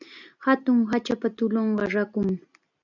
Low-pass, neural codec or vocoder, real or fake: 7.2 kHz; none; real